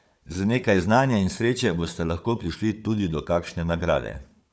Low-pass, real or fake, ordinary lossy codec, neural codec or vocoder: none; fake; none; codec, 16 kHz, 4 kbps, FunCodec, trained on Chinese and English, 50 frames a second